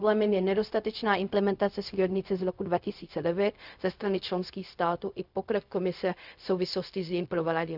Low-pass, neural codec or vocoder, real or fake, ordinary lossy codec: 5.4 kHz; codec, 16 kHz, 0.4 kbps, LongCat-Audio-Codec; fake; none